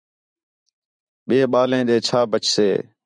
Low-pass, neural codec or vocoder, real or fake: 9.9 kHz; vocoder, 44.1 kHz, 128 mel bands every 512 samples, BigVGAN v2; fake